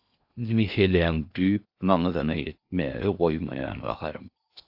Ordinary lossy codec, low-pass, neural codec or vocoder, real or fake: AAC, 48 kbps; 5.4 kHz; codec, 16 kHz in and 24 kHz out, 0.8 kbps, FocalCodec, streaming, 65536 codes; fake